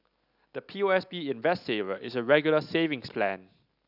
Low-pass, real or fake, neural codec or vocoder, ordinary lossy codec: 5.4 kHz; real; none; none